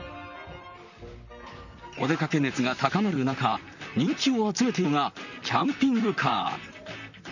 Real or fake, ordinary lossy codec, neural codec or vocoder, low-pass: fake; none; vocoder, 44.1 kHz, 128 mel bands, Pupu-Vocoder; 7.2 kHz